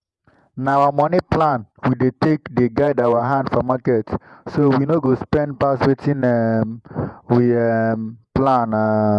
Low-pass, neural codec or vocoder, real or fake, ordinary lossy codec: 10.8 kHz; vocoder, 48 kHz, 128 mel bands, Vocos; fake; none